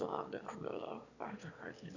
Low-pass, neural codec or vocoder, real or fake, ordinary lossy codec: 7.2 kHz; autoencoder, 22.05 kHz, a latent of 192 numbers a frame, VITS, trained on one speaker; fake; none